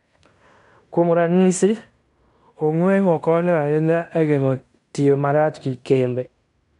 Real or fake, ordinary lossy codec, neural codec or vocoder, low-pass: fake; none; codec, 16 kHz in and 24 kHz out, 0.9 kbps, LongCat-Audio-Codec, fine tuned four codebook decoder; 10.8 kHz